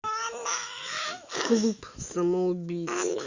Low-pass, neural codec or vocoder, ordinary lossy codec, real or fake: 7.2 kHz; autoencoder, 48 kHz, 128 numbers a frame, DAC-VAE, trained on Japanese speech; Opus, 64 kbps; fake